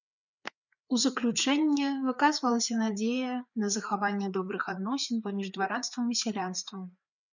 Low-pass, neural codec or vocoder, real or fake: 7.2 kHz; codec, 16 kHz, 4 kbps, FreqCodec, larger model; fake